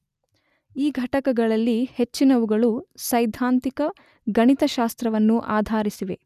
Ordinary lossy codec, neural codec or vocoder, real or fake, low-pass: none; none; real; 14.4 kHz